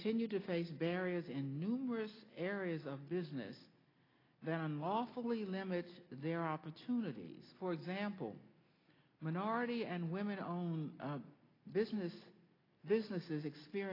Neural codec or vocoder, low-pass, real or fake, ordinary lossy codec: none; 5.4 kHz; real; AAC, 24 kbps